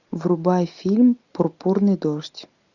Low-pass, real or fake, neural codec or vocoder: 7.2 kHz; real; none